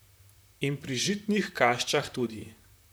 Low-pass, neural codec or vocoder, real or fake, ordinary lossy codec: none; vocoder, 44.1 kHz, 128 mel bands, Pupu-Vocoder; fake; none